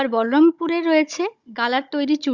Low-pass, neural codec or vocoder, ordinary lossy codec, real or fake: 7.2 kHz; codec, 16 kHz, 16 kbps, FunCodec, trained on Chinese and English, 50 frames a second; Opus, 64 kbps; fake